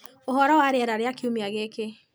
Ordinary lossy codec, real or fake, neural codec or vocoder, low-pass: none; real; none; none